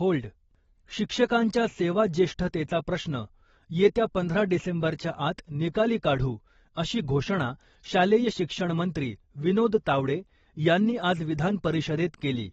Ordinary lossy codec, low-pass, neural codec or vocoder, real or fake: AAC, 24 kbps; 19.8 kHz; none; real